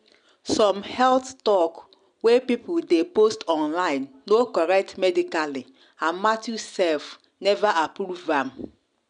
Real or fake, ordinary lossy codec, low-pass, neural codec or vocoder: real; none; 9.9 kHz; none